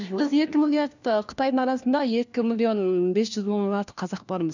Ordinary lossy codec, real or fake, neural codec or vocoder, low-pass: none; fake; codec, 16 kHz, 1 kbps, FunCodec, trained on LibriTTS, 50 frames a second; 7.2 kHz